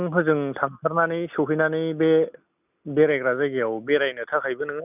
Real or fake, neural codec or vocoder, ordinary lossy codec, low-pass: real; none; none; 3.6 kHz